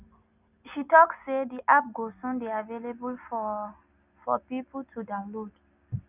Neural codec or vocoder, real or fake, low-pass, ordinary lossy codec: none; real; 3.6 kHz; none